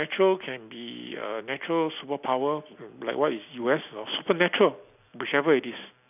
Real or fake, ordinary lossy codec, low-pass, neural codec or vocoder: real; none; 3.6 kHz; none